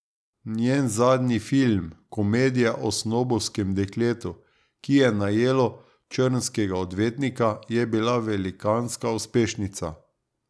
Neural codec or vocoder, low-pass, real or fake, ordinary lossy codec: none; none; real; none